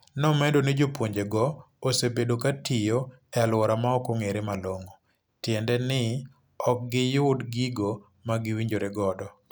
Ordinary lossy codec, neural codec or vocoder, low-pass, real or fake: none; none; none; real